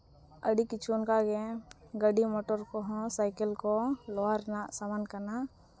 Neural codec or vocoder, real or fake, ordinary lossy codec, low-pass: none; real; none; none